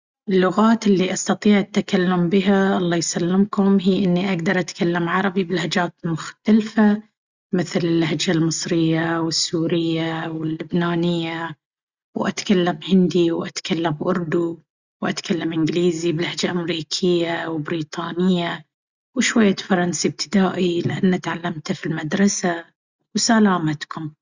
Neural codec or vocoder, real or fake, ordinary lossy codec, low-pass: none; real; Opus, 64 kbps; 7.2 kHz